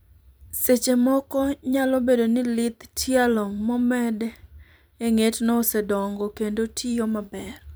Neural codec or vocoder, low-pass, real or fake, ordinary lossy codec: vocoder, 44.1 kHz, 128 mel bands every 256 samples, BigVGAN v2; none; fake; none